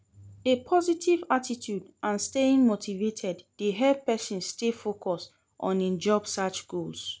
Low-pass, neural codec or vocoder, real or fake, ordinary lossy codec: none; none; real; none